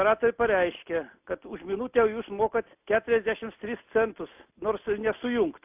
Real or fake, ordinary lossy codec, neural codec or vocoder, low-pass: real; AAC, 32 kbps; none; 3.6 kHz